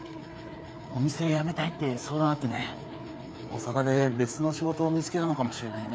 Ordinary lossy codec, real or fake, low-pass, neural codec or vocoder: none; fake; none; codec, 16 kHz, 4 kbps, FreqCodec, larger model